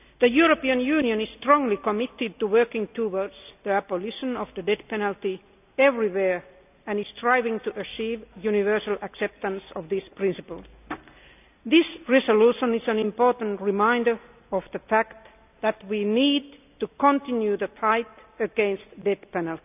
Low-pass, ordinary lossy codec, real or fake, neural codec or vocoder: 3.6 kHz; none; real; none